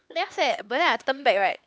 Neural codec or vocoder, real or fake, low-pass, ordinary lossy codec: codec, 16 kHz, 2 kbps, X-Codec, HuBERT features, trained on LibriSpeech; fake; none; none